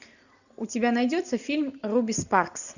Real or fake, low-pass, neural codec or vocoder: real; 7.2 kHz; none